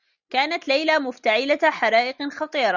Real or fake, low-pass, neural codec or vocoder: real; 7.2 kHz; none